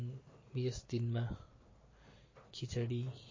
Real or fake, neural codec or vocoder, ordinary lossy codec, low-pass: real; none; MP3, 32 kbps; 7.2 kHz